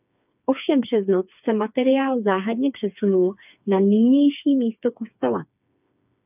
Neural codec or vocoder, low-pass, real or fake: codec, 16 kHz, 4 kbps, FreqCodec, smaller model; 3.6 kHz; fake